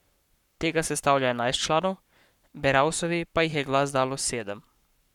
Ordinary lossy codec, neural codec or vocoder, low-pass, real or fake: none; codec, 44.1 kHz, 7.8 kbps, Pupu-Codec; 19.8 kHz; fake